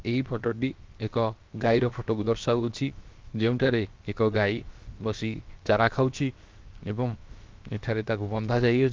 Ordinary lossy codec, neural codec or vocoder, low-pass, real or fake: Opus, 32 kbps; codec, 16 kHz, 0.7 kbps, FocalCodec; 7.2 kHz; fake